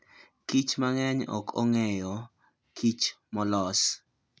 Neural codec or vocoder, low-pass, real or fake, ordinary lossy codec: none; none; real; none